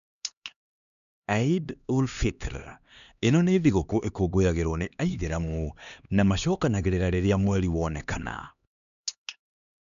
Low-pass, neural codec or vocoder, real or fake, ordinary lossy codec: 7.2 kHz; codec, 16 kHz, 2 kbps, X-Codec, HuBERT features, trained on LibriSpeech; fake; none